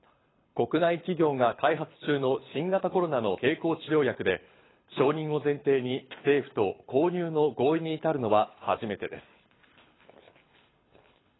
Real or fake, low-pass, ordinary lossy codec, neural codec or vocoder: fake; 7.2 kHz; AAC, 16 kbps; codec, 24 kHz, 6 kbps, HILCodec